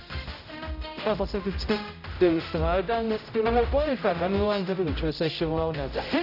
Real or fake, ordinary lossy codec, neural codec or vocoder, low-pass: fake; none; codec, 16 kHz, 0.5 kbps, X-Codec, HuBERT features, trained on general audio; 5.4 kHz